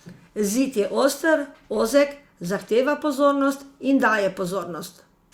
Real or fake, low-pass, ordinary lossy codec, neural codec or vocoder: real; 19.8 kHz; Opus, 64 kbps; none